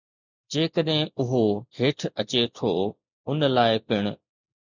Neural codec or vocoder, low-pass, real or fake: none; 7.2 kHz; real